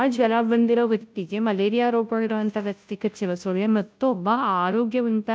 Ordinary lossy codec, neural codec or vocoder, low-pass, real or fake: none; codec, 16 kHz, 0.5 kbps, FunCodec, trained on Chinese and English, 25 frames a second; none; fake